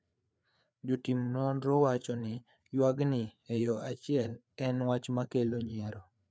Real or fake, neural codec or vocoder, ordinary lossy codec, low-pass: fake; codec, 16 kHz, 4 kbps, FreqCodec, larger model; none; none